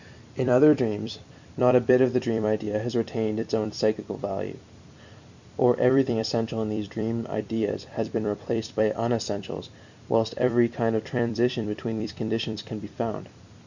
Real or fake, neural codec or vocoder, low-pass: fake; vocoder, 44.1 kHz, 128 mel bands every 256 samples, BigVGAN v2; 7.2 kHz